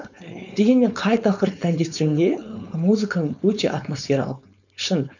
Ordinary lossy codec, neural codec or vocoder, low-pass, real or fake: none; codec, 16 kHz, 4.8 kbps, FACodec; 7.2 kHz; fake